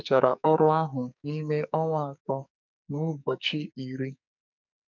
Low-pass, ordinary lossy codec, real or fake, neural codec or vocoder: 7.2 kHz; none; fake; codec, 44.1 kHz, 2.6 kbps, SNAC